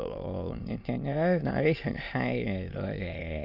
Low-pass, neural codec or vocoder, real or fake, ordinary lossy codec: 5.4 kHz; autoencoder, 22.05 kHz, a latent of 192 numbers a frame, VITS, trained on many speakers; fake; none